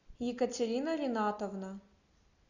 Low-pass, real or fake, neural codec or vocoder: 7.2 kHz; real; none